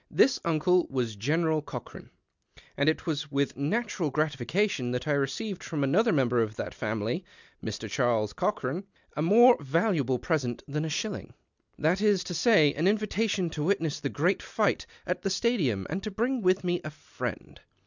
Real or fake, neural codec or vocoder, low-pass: real; none; 7.2 kHz